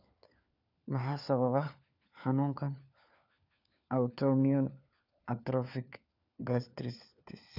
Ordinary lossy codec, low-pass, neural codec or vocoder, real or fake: none; 5.4 kHz; codec, 16 kHz, 4 kbps, FunCodec, trained on LibriTTS, 50 frames a second; fake